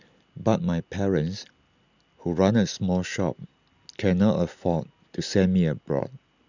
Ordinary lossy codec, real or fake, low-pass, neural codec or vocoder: none; real; 7.2 kHz; none